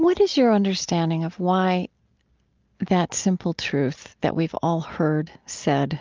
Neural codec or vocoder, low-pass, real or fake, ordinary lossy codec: none; 7.2 kHz; real; Opus, 24 kbps